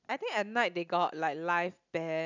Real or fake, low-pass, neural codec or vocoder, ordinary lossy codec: real; 7.2 kHz; none; none